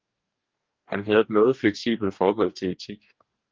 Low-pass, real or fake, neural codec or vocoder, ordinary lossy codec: 7.2 kHz; fake; codec, 44.1 kHz, 2.6 kbps, DAC; Opus, 32 kbps